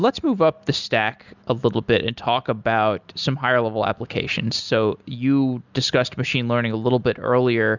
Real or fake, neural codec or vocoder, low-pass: real; none; 7.2 kHz